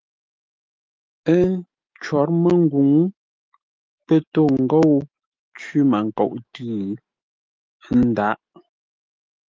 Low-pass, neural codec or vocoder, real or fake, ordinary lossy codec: 7.2 kHz; autoencoder, 48 kHz, 128 numbers a frame, DAC-VAE, trained on Japanese speech; fake; Opus, 32 kbps